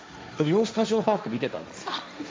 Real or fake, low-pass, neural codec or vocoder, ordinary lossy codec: fake; none; codec, 16 kHz, 1.1 kbps, Voila-Tokenizer; none